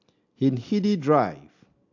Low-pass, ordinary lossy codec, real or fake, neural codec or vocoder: 7.2 kHz; AAC, 48 kbps; real; none